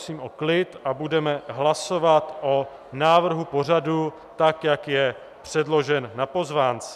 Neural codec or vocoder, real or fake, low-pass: none; real; 14.4 kHz